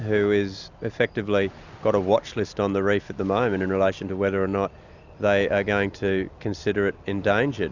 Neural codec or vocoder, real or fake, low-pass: none; real; 7.2 kHz